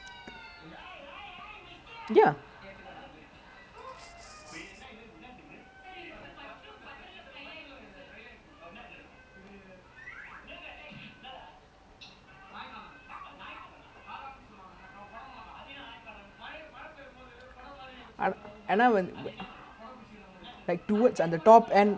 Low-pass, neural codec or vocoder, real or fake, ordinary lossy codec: none; none; real; none